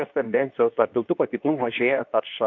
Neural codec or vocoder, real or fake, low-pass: codec, 24 kHz, 0.9 kbps, WavTokenizer, medium speech release version 2; fake; 7.2 kHz